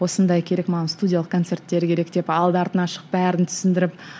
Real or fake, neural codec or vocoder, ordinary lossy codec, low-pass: real; none; none; none